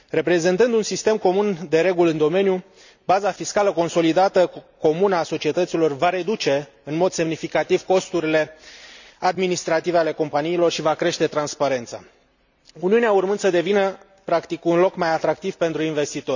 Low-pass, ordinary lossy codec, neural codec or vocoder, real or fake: 7.2 kHz; none; none; real